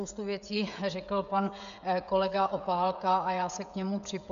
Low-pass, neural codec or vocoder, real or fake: 7.2 kHz; codec, 16 kHz, 16 kbps, FreqCodec, smaller model; fake